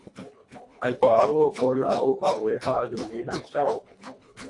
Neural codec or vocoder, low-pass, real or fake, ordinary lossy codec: codec, 24 kHz, 1.5 kbps, HILCodec; 10.8 kHz; fake; AAC, 48 kbps